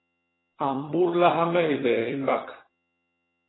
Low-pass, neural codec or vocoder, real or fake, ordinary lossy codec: 7.2 kHz; vocoder, 22.05 kHz, 80 mel bands, HiFi-GAN; fake; AAC, 16 kbps